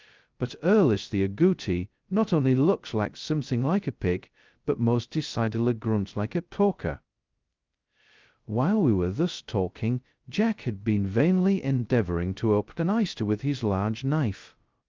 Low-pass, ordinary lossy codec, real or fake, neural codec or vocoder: 7.2 kHz; Opus, 32 kbps; fake; codec, 16 kHz, 0.2 kbps, FocalCodec